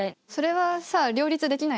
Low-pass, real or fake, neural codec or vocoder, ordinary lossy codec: none; real; none; none